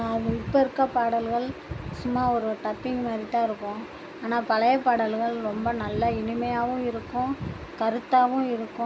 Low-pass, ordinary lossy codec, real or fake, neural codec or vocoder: none; none; real; none